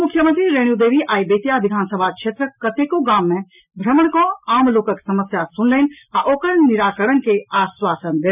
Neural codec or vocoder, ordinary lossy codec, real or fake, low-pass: none; none; real; 3.6 kHz